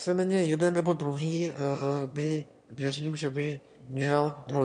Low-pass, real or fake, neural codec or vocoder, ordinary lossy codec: 9.9 kHz; fake; autoencoder, 22.05 kHz, a latent of 192 numbers a frame, VITS, trained on one speaker; AAC, 64 kbps